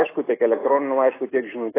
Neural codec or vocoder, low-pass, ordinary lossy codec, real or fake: none; 3.6 kHz; AAC, 16 kbps; real